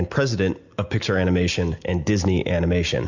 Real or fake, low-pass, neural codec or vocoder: real; 7.2 kHz; none